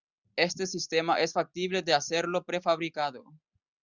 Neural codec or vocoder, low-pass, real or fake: none; 7.2 kHz; real